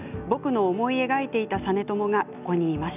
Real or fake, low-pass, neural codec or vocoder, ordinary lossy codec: real; 3.6 kHz; none; none